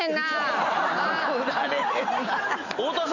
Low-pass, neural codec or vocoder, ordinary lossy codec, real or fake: 7.2 kHz; none; none; real